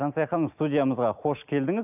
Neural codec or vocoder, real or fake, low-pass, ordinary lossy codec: none; real; 3.6 kHz; none